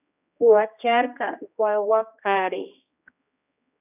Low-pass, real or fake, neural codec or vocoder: 3.6 kHz; fake; codec, 16 kHz, 1 kbps, X-Codec, HuBERT features, trained on general audio